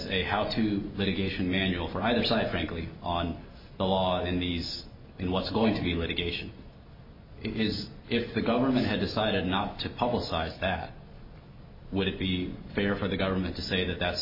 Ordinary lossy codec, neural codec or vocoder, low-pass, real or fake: MP3, 24 kbps; none; 5.4 kHz; real